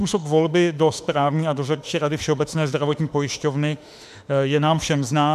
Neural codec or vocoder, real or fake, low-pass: autoencoder, 48 kHz, 32 numbers a frame, DAC-VAE, trained on Japanese speech; fake; 14.4 kHz